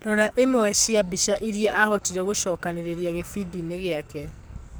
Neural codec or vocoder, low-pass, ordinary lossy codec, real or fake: codec, 44.1 kHz, 2.6 kbps, SNAC; none; none; fake